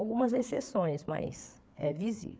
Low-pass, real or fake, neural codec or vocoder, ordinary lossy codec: none; fake; codec, 16 kHz, 4 kbps, FreqCodec, larger model; none